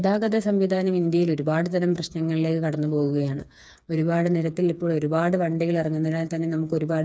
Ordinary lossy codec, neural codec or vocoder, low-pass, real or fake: none; codec, 16 kHz, 4 kbps, FreqCodec, smaller model; none; fake